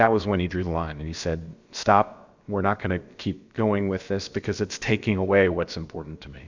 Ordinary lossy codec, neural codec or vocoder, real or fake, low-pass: Opus, 64 kbps; codec, 16 kHz, about 1 kbps, DyCAST, with the encoder's durations; fake; 7.2 kHz